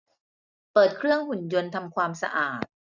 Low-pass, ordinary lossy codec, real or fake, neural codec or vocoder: 7.2 kHz; none; real; none